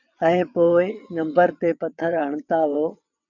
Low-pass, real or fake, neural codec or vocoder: 7.2 kHz; fake; vocoder, 22.05 kHz, 80 mel bands, WaveNeXt